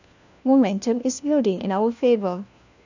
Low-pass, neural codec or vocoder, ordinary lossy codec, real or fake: 7.2 kHz; codec, 16 kHz, 1 kbps, FunCodec, trained on LibriTTS, 50 frames a second; none; fake